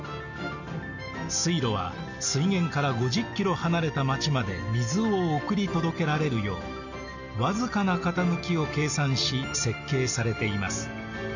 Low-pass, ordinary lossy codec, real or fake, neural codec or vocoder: 7.2 kHz; none; real; none